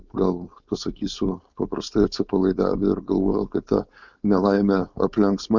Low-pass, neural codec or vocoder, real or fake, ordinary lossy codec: 7.2 kHz; codec, 16 kHz, 4.8 kbps, FACodec; fake; Opus, 64 kbps